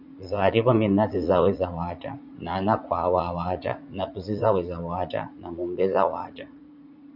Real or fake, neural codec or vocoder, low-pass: fake; vocoder, 44.1 kHz, 80 mel bands, Vocos; 5.4 kHz